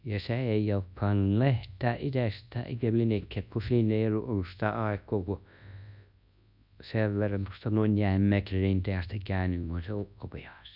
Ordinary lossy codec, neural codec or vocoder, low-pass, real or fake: none; codec, 24 kHz, 0.9 kbps, WavTokenizer, large speech release; 5.4 kHz; fake